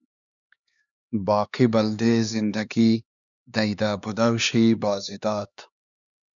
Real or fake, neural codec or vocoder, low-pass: fake; codec, 16 kHz, 2 kbps, X-Codec, HuBERT features, trained on LibriSpeech; 7.2 kHz